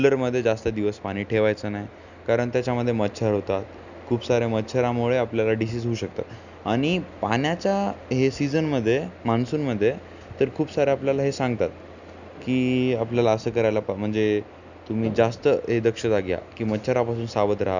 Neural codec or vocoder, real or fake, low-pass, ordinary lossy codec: none; real; 7.2 kHz; none